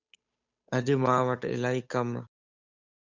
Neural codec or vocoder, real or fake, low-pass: codec, 16 kHz, 8 kbps, FunCodec, trained on Chinese and English, 25 frames a second; fake; 7.2 kHz